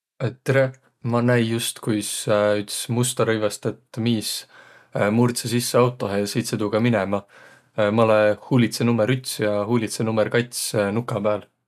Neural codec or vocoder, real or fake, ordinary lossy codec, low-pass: none; real; none; 14.4 kHz